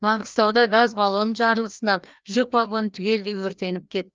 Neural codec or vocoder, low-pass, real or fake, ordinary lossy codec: codec, 16 kHz, 1 kbps, FreqCodec, larger model; 7.2 kHz; fake; Opus, 24 kbps